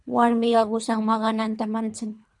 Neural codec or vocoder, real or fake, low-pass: codec, 24 kHz, 3 kbps, HILCodec; fake; 10.8 kHz